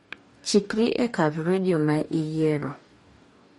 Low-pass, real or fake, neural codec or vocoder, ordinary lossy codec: 19.8 kHz; fake; codec, 44.1 kHz, 2.6 kbps, DAC; MP3, 48 kbps